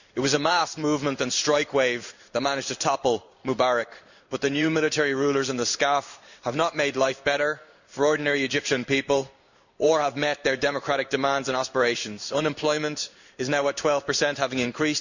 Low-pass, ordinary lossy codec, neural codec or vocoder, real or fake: 7.2 kHz; none; codec, 16 kHz in and 24 kHz out, 1 kbps, XY-Tokenizer; fake